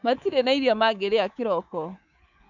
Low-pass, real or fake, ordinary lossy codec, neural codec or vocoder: 7.2 kHz; fake; none; codec, 24 kHz, 3.1 kbps, DualCodec